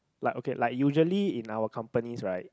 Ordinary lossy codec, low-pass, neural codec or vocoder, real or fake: none; none; none; real